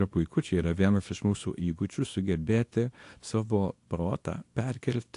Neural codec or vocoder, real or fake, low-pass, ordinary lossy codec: codec, 24 kHz, 0.9 kbps, WavTokenizer, small release; fake; 10.8 kHz; AAC, 48 kbps